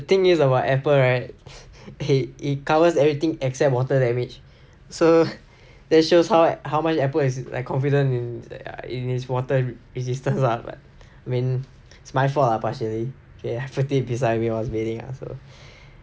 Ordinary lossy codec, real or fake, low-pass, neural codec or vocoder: none; real; none; none